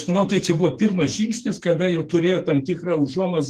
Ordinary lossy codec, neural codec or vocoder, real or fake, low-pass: Opus, 24 kbps; codec, 44.1 kHz, 2.6 kbps, SNAC; fake; 14.4 kHz